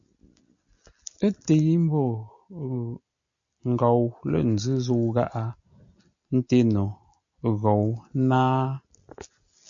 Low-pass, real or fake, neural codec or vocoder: 7.2 kHz; real; none